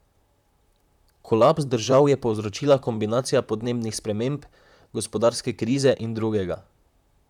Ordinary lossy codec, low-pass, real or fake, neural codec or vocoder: none; 19.8 kHz; fake; vocoder, 44.1 kHz, 128 mel bands, Pupu-Vocoder